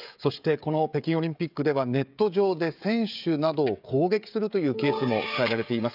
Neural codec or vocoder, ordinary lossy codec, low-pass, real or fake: codec, 16 kHz, 16 kbps, FreqCodec, smaller model; none; 5.4 kHz; fake